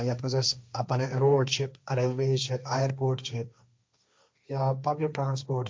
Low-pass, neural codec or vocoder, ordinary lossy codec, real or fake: none; codec, 16 kHz, 1.1 kbps, Voila-Tokenizer; none; fake